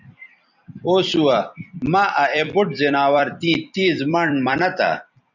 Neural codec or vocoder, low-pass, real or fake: vocoder, 44.1 kHz, 128 mel bands every 256 samples, BigVGAN v2; 7.2 kHz; fake